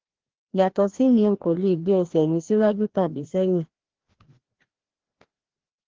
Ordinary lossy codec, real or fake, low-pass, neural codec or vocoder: Opus, 16 kbps; fake; 7.2 kHz; codec, 16 kHz, 1 kbps, FreqCodec, larger model